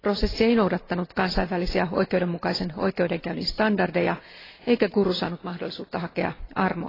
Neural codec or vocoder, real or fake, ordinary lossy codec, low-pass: none; real; AAC, 24 kbps; 5.4 kHz